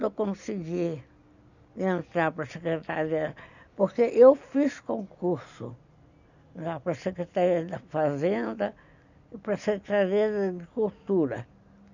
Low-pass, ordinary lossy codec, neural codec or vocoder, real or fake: 7.2 kHz; none; none; real